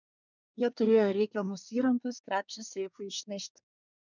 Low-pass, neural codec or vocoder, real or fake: 7.2 kHz; codec, 24 kHz, 1 kbps, SNAC; fake